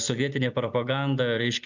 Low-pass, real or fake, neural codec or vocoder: 7.2 kHz; real; none